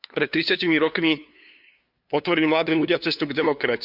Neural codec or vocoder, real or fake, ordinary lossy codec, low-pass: codec, 16 kHz, 2 kbps, FunCodec, trained on LibriTTS, 25 frames a second; fake; Opus, 64 kbps; 5.4 kHz